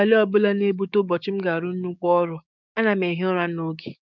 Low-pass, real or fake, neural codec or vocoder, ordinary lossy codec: 7.2 kHz; fake; codec, 16 kHz, 6 kbps, DAC; none